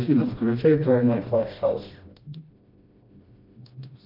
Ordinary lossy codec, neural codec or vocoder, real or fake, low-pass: MP3, 32 kbps; codec, 16 kHz, 1 kbps, FreqCodec, smaller model; fake; 5.4 kHz